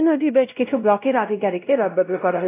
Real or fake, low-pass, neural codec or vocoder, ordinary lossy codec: fake; 3.6 kHz; codec, 16 kHz, 0.5 kbps, X-Codec, WavLM features, trained on Multilingual LibriSpeech; AAC, 24 kbps